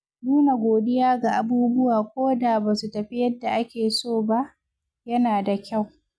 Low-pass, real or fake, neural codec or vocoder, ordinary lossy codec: none; real; none; none